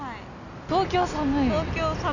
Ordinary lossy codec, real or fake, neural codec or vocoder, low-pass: none; real; none; 7.2 kHz